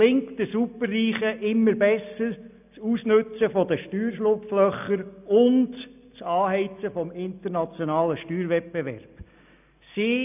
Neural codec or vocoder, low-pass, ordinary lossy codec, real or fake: none; 3.6 kHz; none; real